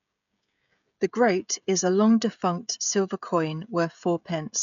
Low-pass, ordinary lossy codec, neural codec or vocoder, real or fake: 7.2 kHz; none; codec, 16 kHz, 16 kbps, FreqCodec, smaller model; fake